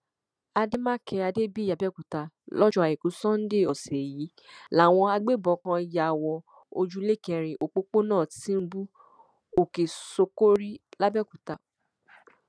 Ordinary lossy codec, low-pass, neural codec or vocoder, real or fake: none; none; none; real